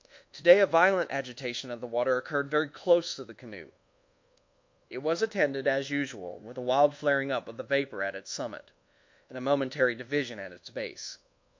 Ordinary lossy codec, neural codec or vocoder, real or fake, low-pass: MP3, 48 kbps; codec, 24 kHz, 1.2 kbps, DualCodec; fake; 7.2 kHz